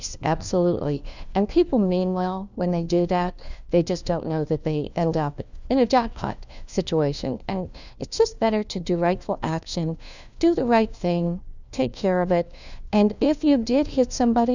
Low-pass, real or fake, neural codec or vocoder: 7.2 kHz; fake; codec, 16 kHz, 1 kbps, FunCodec, trained on LibriTTS, 50 frames a second